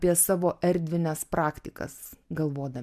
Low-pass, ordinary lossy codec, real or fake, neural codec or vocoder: 14.4 kHz; MP3, 96 kbps; real; none